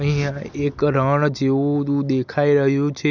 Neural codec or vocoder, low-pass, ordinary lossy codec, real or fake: none; 7.2 kHz; none; real